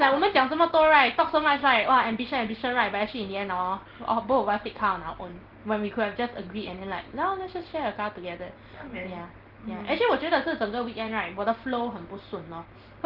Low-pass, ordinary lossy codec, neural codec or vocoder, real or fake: 5.4 kHz; Opus, 16 kbps; none; real